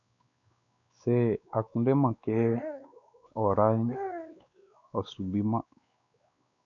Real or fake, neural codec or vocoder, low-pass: fake; codec, 16 kHz, 4 kbps, X-Codec, WavLM features, trained on Multilingual LibriSpeech; 7.2 kHz